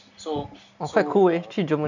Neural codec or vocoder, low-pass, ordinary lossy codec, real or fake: none; 7.2 kHz; none; real